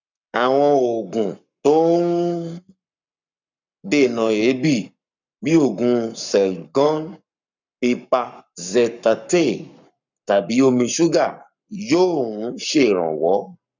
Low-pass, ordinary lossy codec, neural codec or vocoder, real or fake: 7.2 kHz; none; codec, 44.1 kHz, 7.8 kbps, Pupu-Codec; fake